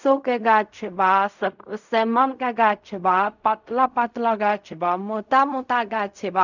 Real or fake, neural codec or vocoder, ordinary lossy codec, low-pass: fake; codec, 16 kHz in and 24 kHz out, 0.4 kbps, LongCat-Audio-Codec, fine tuned four codebook decoder; none; 7.2 kHz